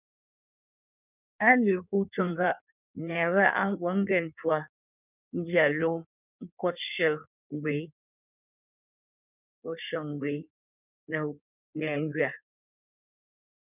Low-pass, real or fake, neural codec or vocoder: 3.6 kHz; fake; codec, 16 kHz in and 24 kHz out, 1.1 kbps, FireRedTTS-2 codec